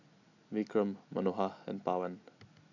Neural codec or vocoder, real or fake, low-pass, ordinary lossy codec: none; real; 7.2 kHz; none